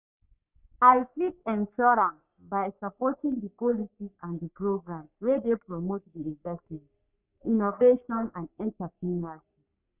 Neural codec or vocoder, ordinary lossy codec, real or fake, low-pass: codec, 44.1 kHz, 3.4 kbps, Pupu-Codec; none; fake; 3.6 kHz